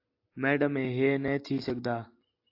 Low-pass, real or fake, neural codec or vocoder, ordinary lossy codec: 5.4 kHz; real; none; AAC, 32 kbps